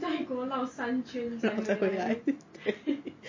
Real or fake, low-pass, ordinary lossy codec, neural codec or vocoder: real; 7.2 kHz; MP3, 32 kbps; none